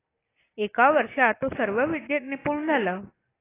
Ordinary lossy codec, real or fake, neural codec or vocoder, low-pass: AAC, 16 kbps; real; none; 3.6 kHz